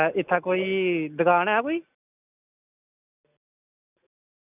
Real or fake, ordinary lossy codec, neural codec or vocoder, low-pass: real; none; none; 3.6 kHz